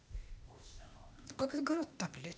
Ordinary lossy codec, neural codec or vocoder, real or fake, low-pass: none; codec, 16 kHz, 0.8 kbps, ZipCodec; fake; none